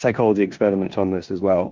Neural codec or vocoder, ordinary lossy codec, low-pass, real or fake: codec, 16 kHz in and 24 kHz out, 0.9 kbps, LongCat-Audio-Codec, four codebook decoder; Opus, 24 kbps; 7.2 kHz; fake